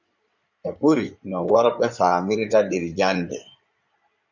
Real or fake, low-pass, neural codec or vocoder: fake; 7.2 kHz; codec, 16 kHz in and 24 kHz out, 2.2 kbps, FireRedTTS-2 codec